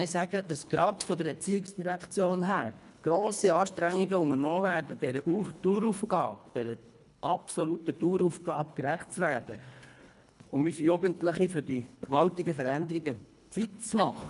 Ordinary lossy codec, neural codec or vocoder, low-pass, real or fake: none; codec, 24 kHz, 1.5 kbps, HILCodec; 10.8 kHz; fake